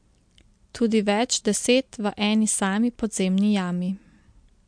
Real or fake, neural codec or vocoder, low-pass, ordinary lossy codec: real; none; 9.9 kHz; MP3, 64 kbps